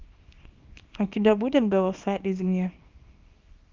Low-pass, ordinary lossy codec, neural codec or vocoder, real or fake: 7.2 kHz; Opus, 32 kbps; codec, 24 kHz, 0.9 kbps, WavTokenizer, small release; fake